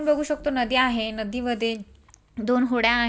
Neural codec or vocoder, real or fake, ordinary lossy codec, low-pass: none; real; none; none